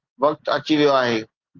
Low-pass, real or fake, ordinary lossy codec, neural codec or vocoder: 7.2 kHz; real; Opus, 16 kbps; none